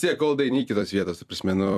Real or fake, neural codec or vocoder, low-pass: fake; vocoder, 44.1 kHz, 128 mel bands every 256 samples, BigVGAN v2; 14.4 kHz